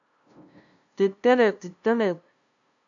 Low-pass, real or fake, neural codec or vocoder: 7.2 kHz; fake; codec, 16 kHz, 0.5 kbps, FunCodec, trained on LibriTTS, 25 frames a second